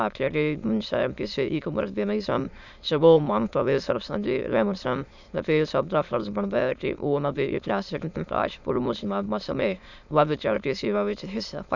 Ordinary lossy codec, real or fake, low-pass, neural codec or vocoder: none; fake; 7.2 kHz; autoencoder, 22.05 kHz, a latent of 192 numbers a frame, VITS, trained on many speakers